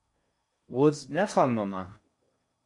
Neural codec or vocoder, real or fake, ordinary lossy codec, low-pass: codec, 16 kHz in and 24 kHz out, 0.6 kbps, FocalCodec, streaming, 4096 codes; fake; MP3, 64 kbps; 10.8 kHz